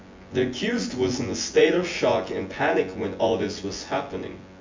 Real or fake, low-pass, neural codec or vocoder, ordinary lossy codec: fake; 7.2 kHz; vocoder, 24 kHz, 100 mel bands, Vocos; MP3, 48 kbps